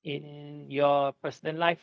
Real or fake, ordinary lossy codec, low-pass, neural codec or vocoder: fake; none; none; codec, 16 kHz, 0.4 kbps, LongCat-Audio-Codec